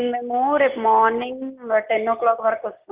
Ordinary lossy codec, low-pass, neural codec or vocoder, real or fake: Opus, 24 kbps; 3.6 kHz; none; real